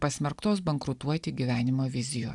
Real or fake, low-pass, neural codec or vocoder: real; 10.8 kHz; none